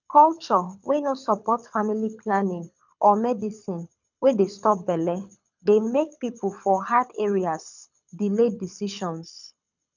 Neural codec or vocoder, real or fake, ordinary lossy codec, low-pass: codec, 24 kHz, 6 kbps, HILCodec; fake; none; 7.2 kHz